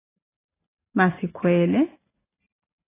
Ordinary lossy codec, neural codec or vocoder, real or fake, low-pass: AAC, 16 kbps; none; real; 3.6 kHz